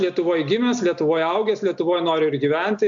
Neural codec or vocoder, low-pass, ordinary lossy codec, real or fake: none; 7.2 kHz; AAC, 64 kbps; real